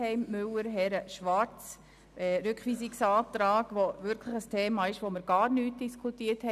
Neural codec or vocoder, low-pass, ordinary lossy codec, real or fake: none; 14.4 kHz; none; real